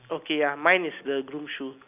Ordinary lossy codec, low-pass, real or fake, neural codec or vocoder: none; 3.6 kHz; real; none